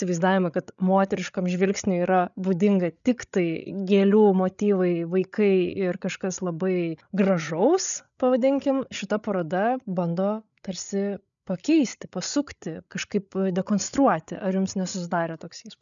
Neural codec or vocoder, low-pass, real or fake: codec, 16 kHz, 8 kbps, FreqCodec, larger model; 7.2 kHz; fake